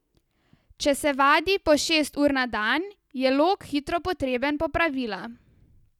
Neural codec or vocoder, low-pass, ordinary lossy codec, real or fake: none; 19.8 kHz; none; real